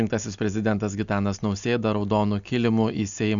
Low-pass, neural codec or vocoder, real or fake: 7.2 kHz; none; real